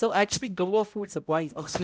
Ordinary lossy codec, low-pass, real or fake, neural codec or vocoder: none; none; fake; codec, 16 kHz, 0.5 kbps, X-Codec, HuBERT features, trained on balanced general audio